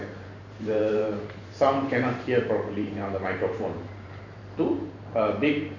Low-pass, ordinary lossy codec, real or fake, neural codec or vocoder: 7.2 kHz; none; fake; vocoder, 44.1 kHz, 128 mel bands every 512 samples, BigVGAN v2